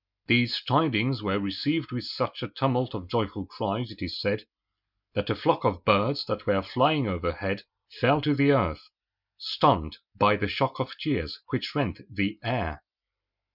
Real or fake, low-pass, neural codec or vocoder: real; 5.4 kHz; none